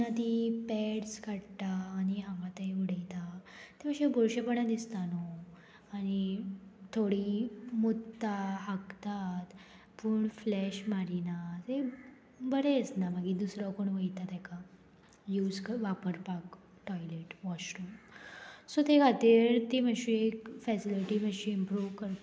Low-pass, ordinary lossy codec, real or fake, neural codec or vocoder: none; none; real; none